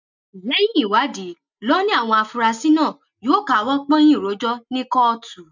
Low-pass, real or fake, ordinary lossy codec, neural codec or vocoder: 7.2 kHz; real; none; none